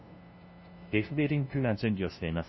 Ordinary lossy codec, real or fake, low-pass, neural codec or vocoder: MP3, 24 kbps; fake; 7.2 kHz; codec, 16 kHz, 0.5 kbps, FunCodec, trained on LibriTTS, 25 frames a second